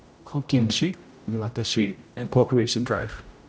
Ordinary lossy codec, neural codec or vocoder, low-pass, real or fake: none; codec, 16 kHz, 0.5 kbps, X-Codec, HuBERT features, trained on general audio; none; fake